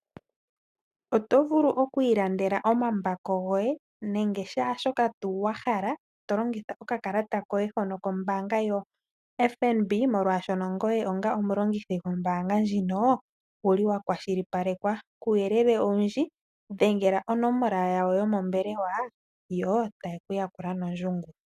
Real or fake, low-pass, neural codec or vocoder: real; 14.4 kHz; none